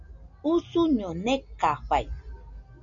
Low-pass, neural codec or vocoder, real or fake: 7.2 kHz; none; real